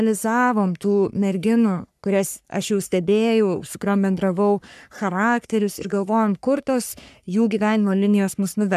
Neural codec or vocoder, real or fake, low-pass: codec, 44.1 kHz, 3.4 kbps, Pupu-Codec; fake; 14.4 kHz